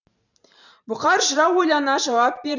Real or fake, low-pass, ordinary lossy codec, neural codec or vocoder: fake; 7.2 kHz; none; vocoder, 44.1 kHz, 128 mel bands every 512 samples, BigVGAN v2